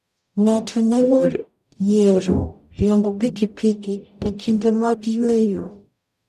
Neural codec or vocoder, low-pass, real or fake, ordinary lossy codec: codec, 44.1 kHz, 0.9 kbps, DAC; 14.4 kHz; fake; none